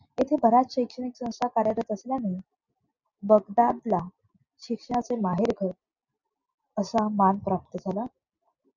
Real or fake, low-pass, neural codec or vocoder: real; 7.2 kHz; none